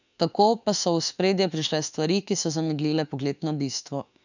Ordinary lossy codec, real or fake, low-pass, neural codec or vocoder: none; fake; 7.2 kHz; autoencoder, 48 kHz, 32 numbers a frame, DAC-VAE, trained on Japanese speech